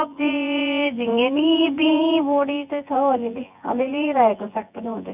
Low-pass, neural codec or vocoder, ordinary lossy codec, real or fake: 3.6 kHz; vocoder, 24 kHz, 100 mel bands, Vocos; none; fake